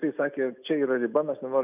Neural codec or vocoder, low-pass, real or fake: none; 3.6 kHz; real